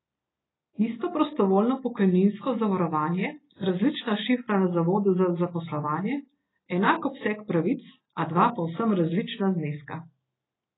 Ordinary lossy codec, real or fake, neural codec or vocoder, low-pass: AAC, 16 kbps; real; none; 7.2 kHz